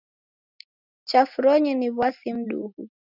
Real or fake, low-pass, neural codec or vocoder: real; 5.4 kHz; none